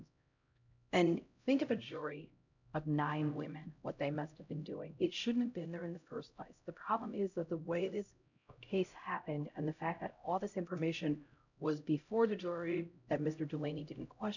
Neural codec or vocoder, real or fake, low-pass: codec, 16 kHz, 0.5 kbps, X-Codec, HuBERT features, trained on LibriSpeech; fake; 7.2 kHz